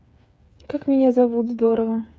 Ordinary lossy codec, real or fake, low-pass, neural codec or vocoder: none; fake; none; codec, 16 kHz, 8 kbps, FreqCodec, smaller model